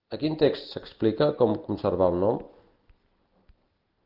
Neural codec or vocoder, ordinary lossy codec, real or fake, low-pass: none; Opus, 32 kbps; real; 5.4 kHz